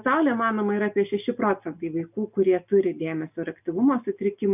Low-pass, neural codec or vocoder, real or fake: 3.6 kHz; none; real